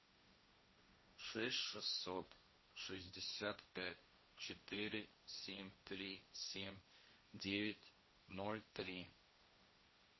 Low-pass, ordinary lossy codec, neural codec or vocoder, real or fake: 7.2 kHz; MP3, 24 kbps; codec, 16 kHz, 1.1 kbps, Voila-Tokenizer; fake